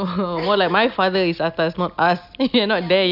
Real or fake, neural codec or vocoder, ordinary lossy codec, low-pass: real; none; none; 5.4 kHz